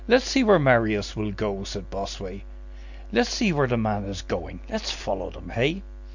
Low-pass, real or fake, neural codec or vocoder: 7.2 kHz; real; none